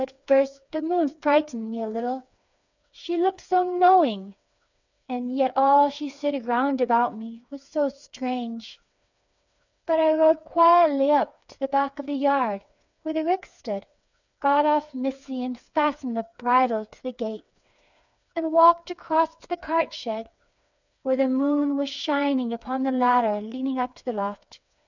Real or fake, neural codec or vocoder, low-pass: fake; codec, 16 kHz, 4 kbps, FreqCodec, smaller model; 7.2 kHz